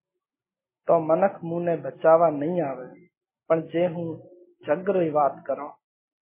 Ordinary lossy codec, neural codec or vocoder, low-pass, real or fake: MP3, 16 kbps; none; 3.6 kHz; real